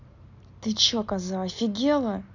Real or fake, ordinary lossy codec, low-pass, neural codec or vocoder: real; none; 7.2 kHz; none